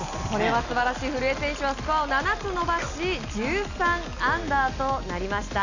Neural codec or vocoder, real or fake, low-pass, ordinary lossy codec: none; real; 7.2 kHz; none